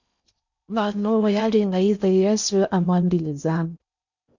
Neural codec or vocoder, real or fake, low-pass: codec, 16 kHz in and 24 kHz out, 0.6 kbps, FocalCodec, streaming, 4096 codes; fake; 7.2 kHz